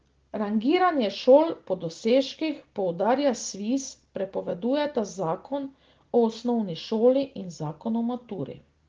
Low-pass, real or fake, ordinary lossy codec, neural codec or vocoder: 7.2 kHz; real; Opus, 16 kbps; none